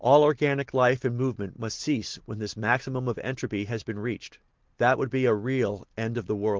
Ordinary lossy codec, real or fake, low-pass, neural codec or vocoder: Opus, 16 kbps; real; 7.2 kHz; none